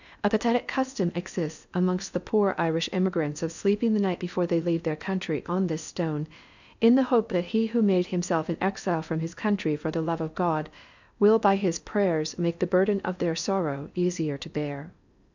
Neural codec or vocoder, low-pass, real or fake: codec, 16 kHz in and 24 kHz out, 0.6 kbps, FocalCodec, streaming, 2048 codes; 7.2 kHz; fake